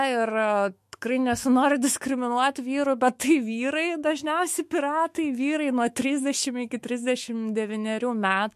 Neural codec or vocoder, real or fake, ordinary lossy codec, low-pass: autoencoder, 48 kHz, 128 numbers a frame, DAC-VAE, trained on Japanese speech; fake; MP3, 64 kbps; 14.4 kHz